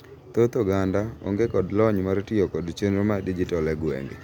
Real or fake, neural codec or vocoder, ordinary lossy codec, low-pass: real; none; none; 19.8 kHz